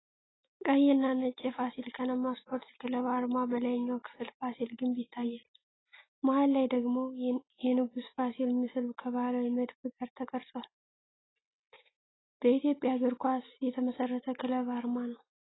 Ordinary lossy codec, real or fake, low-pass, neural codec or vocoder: AAC, 16 kbps; real; 7.2 kHz; none